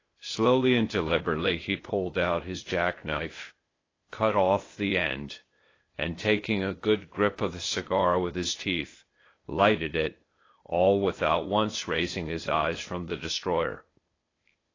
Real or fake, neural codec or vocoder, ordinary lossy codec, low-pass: fake; codec, 16 kHz, 0.8 kbps, ZipCodec; AAC, 32 kbps; 7.2 kHz